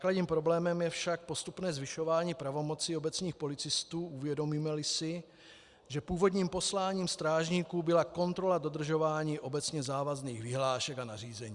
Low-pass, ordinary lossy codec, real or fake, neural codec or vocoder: 10.8 kHz; Opus, 64 kbps; real; none